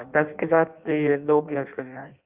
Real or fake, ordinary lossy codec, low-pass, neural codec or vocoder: fake; Opus, 24 kbps; 3.6 kHz; codec, 16 kHz in and 24 kHz out, 0.6 kbps, FireRedTTS-2 codec